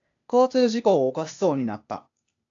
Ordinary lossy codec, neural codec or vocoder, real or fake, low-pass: AAC, 64 kbps; codec, 16 kHz, 0.8 kbps, ZipCodec; fake; 7.2 kHz